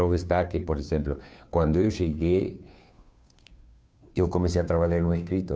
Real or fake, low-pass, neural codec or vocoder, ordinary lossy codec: fake; none; codec, 16 kHz, 2 kbps, FunCodec, trained on Chinese and English, 25 frames a second; none